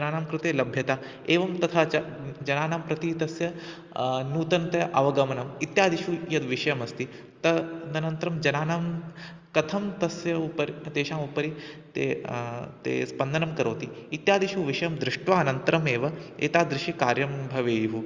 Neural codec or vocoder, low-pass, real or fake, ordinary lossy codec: none; 7.2 kHz; real; Opus, 32 kbps